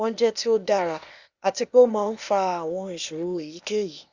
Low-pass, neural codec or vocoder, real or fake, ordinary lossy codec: 7.2 kHz; codec, 16 kHz, 0.8 kbps, ZipCodec; fake; Opus, 64 kbps